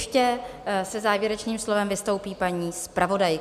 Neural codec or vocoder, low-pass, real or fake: none; 14.4 kHz; real